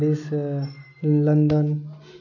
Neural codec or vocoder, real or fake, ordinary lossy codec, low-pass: none; real; none; 7.2 kHz